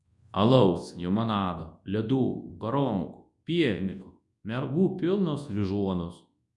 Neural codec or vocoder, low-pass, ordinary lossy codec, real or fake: codec, 24 kHz, 0.9 kbps, WavTokenizer, large speech release; 10.8 kHz; MP3, 64 kbps; fake